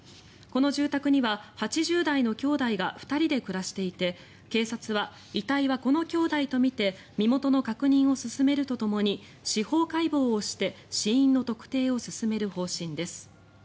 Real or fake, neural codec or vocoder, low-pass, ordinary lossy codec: real; none; none; none